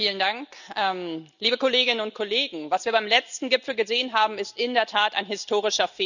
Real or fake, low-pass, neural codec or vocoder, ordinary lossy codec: real; 7.2 kHz; none; none